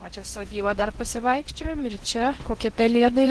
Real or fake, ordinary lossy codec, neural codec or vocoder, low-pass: fake; Opus, 16 kbps; codec, 16 kHz in and 24 kHz out, 0.8 kbps, FocalCodec, streaming, 65536 codes; 10.8 kHz